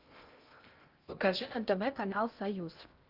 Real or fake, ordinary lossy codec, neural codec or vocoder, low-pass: fake; Opus, 32 kbps; codec, 16 kHz in and 24 kHz out, 0.6 kbps, FocalCodec, streaming, 2048 codes; 5.4 kHz